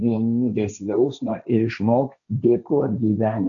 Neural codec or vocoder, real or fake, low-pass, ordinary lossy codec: codec, 16 kHz, 1.1 kbps, Voila-Tokenizer; fake; 7.2 kHz; MP3, 96 kbps